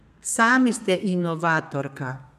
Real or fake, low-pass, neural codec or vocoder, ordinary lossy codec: fake; 14.4 kHz; codec, 32 kHz, 1.9 kbps, SNAC; none